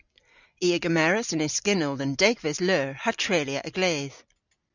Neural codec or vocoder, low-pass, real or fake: none; 7.2 kHz; real